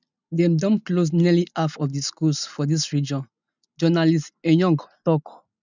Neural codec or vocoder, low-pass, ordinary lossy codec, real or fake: none; 7.2 kHz; none; real